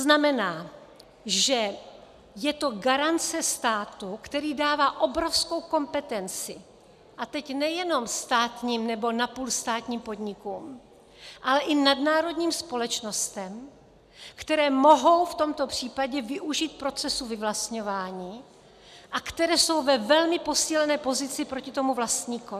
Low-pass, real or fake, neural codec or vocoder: 14.4 kHz; real; none